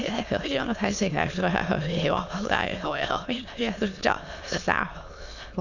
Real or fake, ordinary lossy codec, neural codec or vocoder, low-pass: fake; none; autoencoder, 22.05 kHz, a latent of 192 numbers a frame, VITS, trained on many speakers; 7.2 kHz